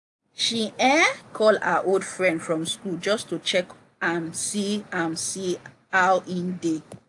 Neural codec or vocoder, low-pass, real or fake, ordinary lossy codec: none; 10.8 kHz; real; AAC, 64 kbps